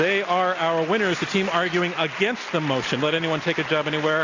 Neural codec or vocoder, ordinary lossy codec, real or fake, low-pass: none; AAC, 48 kbps; real; 7.2 kHz